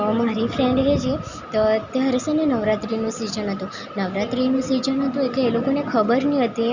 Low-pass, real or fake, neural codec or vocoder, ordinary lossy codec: 7.2 kHz; real; none; Opus, 64 kbps